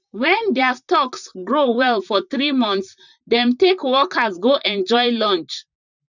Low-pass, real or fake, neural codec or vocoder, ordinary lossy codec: 7.2 kHz; fake; vocoder, 44.1 kHz, 128 mel bands, Pupu-Vocoder; none